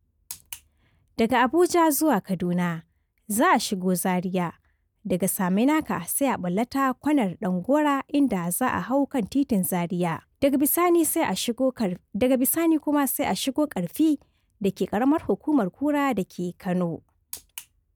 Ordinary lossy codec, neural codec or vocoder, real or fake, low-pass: none; none; real; none